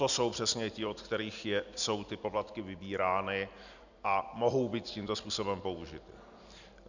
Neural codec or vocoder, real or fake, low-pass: none; real; 7.2 kHz